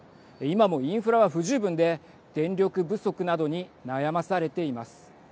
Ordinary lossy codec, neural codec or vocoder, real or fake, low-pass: none; none; real; none